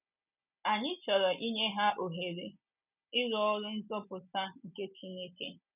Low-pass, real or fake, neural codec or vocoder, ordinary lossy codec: 3.6 kHz; real; none; none